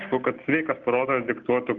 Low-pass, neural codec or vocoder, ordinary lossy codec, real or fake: 7.2 kHz; none; Opus, 32 kbps; real